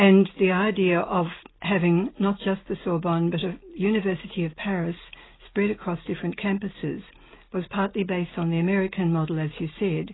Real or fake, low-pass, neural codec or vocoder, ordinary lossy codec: real; 7.2 kHz; none; AAC, 16 kbps